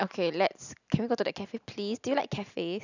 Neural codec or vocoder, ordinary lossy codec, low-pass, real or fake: none; none; 7.2 kHz; real